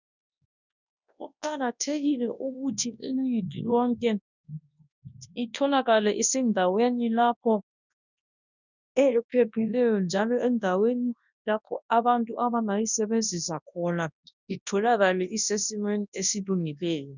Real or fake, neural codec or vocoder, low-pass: fake; codec, 24 kHz, 0.9 kbps, WavTokenizer, large speech release; 7.2 kHz